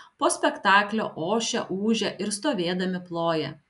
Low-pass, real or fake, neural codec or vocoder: 10.8 kHz; real; none